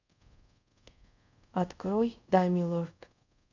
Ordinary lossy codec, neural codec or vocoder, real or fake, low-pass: none; codec, 24 kHz, 0.5 kbps, DualCodec; fake; 7.2 kHz